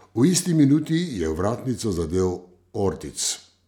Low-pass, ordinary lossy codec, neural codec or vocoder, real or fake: 19.8 kHz; none; none; real